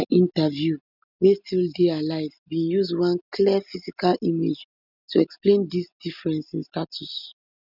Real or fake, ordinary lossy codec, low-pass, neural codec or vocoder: real; none; 5.4 kHz; none